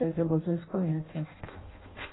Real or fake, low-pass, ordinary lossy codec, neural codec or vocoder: fake; 7.2 kHz; AAC, 16 kbps; codec, 16 kHz in and 24 kHz out, 0.6 kbps, FireRedTTS-2 codec